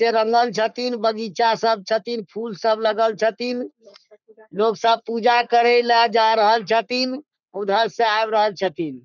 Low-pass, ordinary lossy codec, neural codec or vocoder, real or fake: 7.2 kHz; none; codec, 44.1 kHz, 3.4 kbps, Pupu-Codec; fake